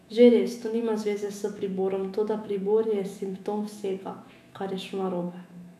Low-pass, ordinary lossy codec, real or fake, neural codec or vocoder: 14.4 kHz; none; fake; autoencoder, 48 kHz, 128 numbers a frame, DAC-VAE, trained on Japanese speech